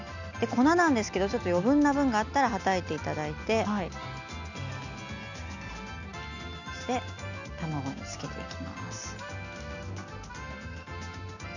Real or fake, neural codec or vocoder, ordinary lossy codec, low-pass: real; none; none; 7.2 kHz